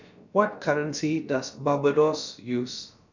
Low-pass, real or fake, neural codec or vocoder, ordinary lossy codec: 7.2 kHz; fake; codec, 16 kHz, about 1 kbps, DyCAST, with the encoder's durations; none